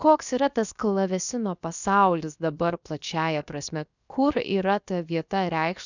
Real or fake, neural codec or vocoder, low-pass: fake; codec, 16 kHz, 0.7 kbps, FocalCodec; 7.2 kHz